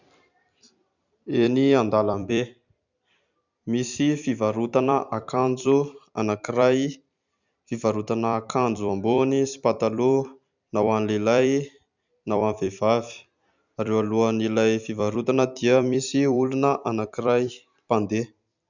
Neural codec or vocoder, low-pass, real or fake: vocoder, 44.1 kHz, 128 mel bands every 256 samples, BigVGAN v2; 7.2 kHz; fake